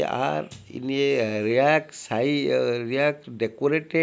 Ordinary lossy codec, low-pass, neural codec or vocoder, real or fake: none; none; none; real